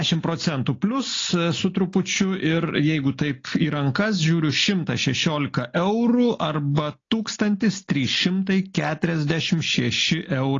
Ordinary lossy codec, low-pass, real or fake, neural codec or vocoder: AAC, 32 kbps; 7.2 kHz; real; none